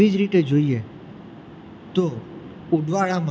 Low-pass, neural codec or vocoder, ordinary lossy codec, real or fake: none; none; none; real